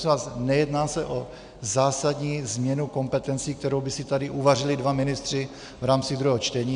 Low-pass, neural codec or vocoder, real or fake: 9.9 kHz; none; real